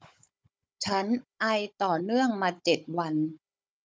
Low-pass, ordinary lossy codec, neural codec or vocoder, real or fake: none; none; codec, 16 kHz, 16 kbps, FunCodec, trained on Chinese and English, 50 frames a second; fake